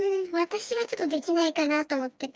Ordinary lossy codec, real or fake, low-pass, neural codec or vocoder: none; fake; none; codec, 16 kHz, 2 kbps, FreqCodec, smaller model